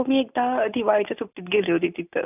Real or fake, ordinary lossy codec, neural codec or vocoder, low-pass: real; none; none; 3.6 kHz